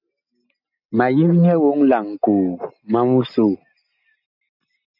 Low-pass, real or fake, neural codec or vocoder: 5.4 kHz; real; none